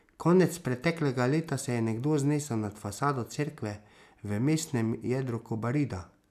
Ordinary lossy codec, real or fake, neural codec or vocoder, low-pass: none; real; none; 14.4 kHz